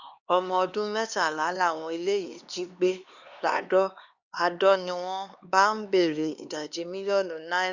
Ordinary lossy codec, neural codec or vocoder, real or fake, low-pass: Opus, 64 kbps; codec, 16 kHz, 2 kbps, X-Codec, HuBERT features, trained on LibriSpeech; fake; 7.2 kHz